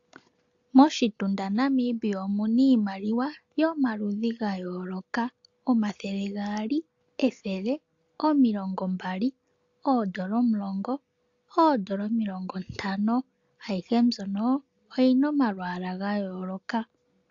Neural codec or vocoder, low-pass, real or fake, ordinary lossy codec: none; 7.2 kHz; real; AAC, 48 kbps